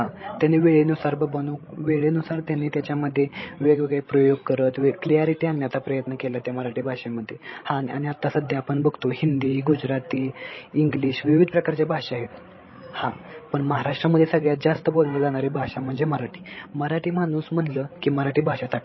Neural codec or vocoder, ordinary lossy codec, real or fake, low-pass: codec, 16 kHz, 16 kbps, FreqCodec, larger model; MP3, 24 kbps; fake; 7.2 kHz